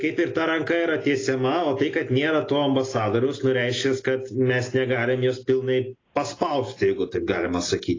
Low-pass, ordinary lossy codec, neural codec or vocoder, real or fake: 7.2 kHz; AAC, 32 kbps; none; real